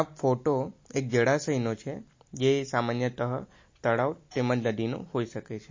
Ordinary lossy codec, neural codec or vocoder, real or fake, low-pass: MP3, 32 kbps; none; real; 7.2 kHz